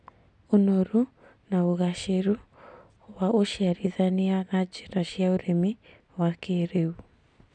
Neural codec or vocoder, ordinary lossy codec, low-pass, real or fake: none; none; 9.9 kHz; real